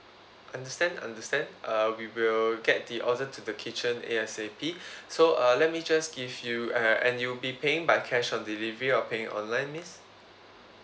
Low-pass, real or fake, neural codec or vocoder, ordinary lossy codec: none; real; none; none